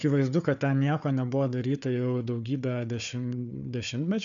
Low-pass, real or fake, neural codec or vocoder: 7.2 kHz; fake; codec, 16 kHz, 4 kbps, FunCodec, trained on Chinese and English, 50 frames a second